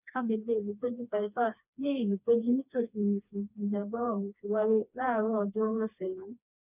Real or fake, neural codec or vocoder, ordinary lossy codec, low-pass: fake; codec, 16 kHz, 2 kbps, FreqCodec, smaller model; none; 3.6 kHz